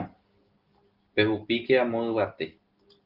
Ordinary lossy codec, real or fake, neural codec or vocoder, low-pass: Opus, 24 kbps; real; none; 5.4 kHz